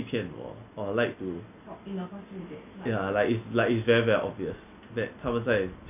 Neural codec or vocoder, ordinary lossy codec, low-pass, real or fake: none; none; 3.6 kHz; real